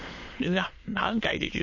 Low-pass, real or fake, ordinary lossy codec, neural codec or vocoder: 7.2 kHz; fake; MP3, 32 kbps; autoencoder, 22.05 kHz, a latent of 192 numbers a frame, VITS, trained on many speakers